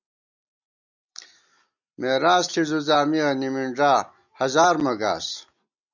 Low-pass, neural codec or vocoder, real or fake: 7.2 kHz; none; real